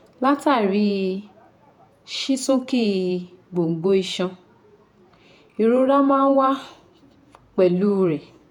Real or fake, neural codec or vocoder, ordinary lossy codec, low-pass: fake; vocoder, 48 kHz, 128 mel bands, Vocos; none; 19.8 kHz